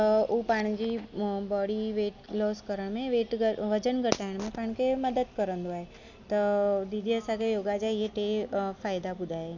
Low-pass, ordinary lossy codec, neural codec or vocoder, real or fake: 7.2 kHz; none; none; real